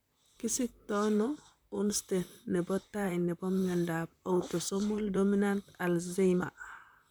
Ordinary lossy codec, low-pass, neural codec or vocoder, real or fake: none; none; vocoder, 44.1 kHz, 128 mel bands, Pupu-Vocoder; fake